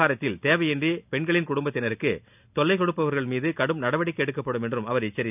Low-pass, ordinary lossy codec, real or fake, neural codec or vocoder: 3.6 kHz; none; real; none